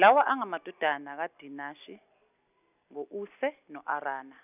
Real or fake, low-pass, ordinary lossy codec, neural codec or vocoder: real; 3.6 kHz; none; none